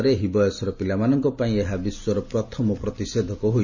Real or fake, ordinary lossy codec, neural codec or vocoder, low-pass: real; none; none; 7.2 kHz